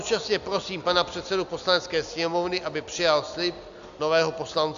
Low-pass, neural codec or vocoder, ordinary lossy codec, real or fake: 7.2 kHz; none; AAC, 96 kbps; real